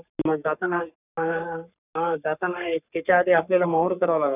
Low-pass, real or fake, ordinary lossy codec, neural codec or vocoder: 3.6 kHz; fake; none; codec, 44.1 kHz, 3.4 kbps, Pupu-Codec